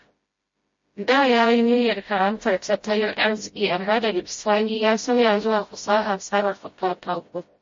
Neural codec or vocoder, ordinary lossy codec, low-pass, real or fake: codec, 16 kHz, 0.5 kbps, FreqCodec, smaller model; MP3, 32 kbps; 7.2 kHz; fake